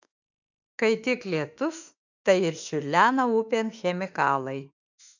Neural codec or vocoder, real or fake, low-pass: autoencoder, 48 kHz, 32 numbers a frame, DAC-VAE, trained on Japanese speech; fake; 7.2 kHz